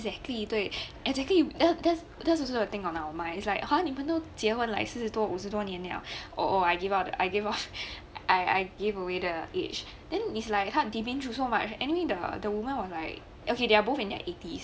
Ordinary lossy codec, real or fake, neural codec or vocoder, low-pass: none; real; none; none